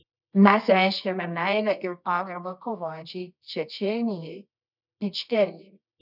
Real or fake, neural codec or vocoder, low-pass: fake; codec, 24 kHz, 0.9 kbps, WavTokenizer, medium music audio release; 5.4 kHz